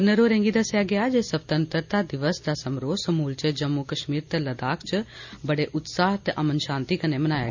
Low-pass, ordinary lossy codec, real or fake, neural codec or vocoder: 7.2 kHz; none; real; none